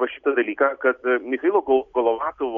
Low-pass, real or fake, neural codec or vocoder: 7.2 kHz; real; none